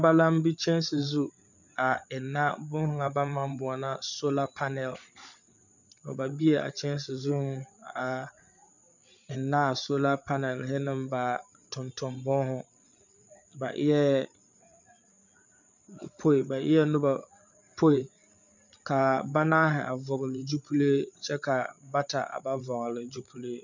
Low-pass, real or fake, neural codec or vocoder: 7.2 kHz; fake; codec, 16 kHz, 8 kbps, FreqCodec, larger model